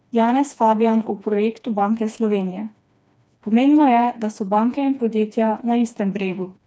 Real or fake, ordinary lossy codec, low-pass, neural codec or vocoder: fake; none; none; codec, 16 kHz, 2 kbps, FreqCodec, smaller model